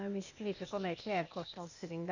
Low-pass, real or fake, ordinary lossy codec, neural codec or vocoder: 7.2 kHz; fake; none; codec, 16 kHz, 0.8 kbps, ZipCodec